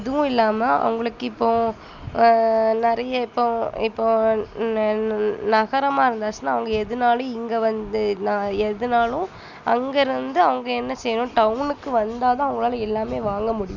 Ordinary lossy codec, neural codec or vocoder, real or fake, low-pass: none; none; real; 7.2 kHz